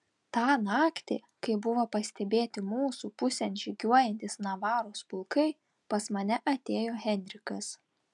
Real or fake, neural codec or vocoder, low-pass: real; none; 10.8 kHz